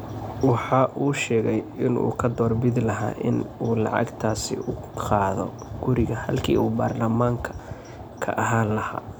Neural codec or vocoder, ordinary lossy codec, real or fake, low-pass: none; none; real; none